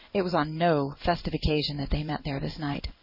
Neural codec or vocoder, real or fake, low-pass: codec, 16 kHz in and 24 kHz out, 1 kbps, XY-Tokenizer; fake; 5.4 kHz